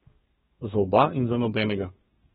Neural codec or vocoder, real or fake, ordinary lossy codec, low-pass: codec, 32 kHz, 1.9 kbps, SNAC; fake; AAC, 16 kbps; 14.4 kHz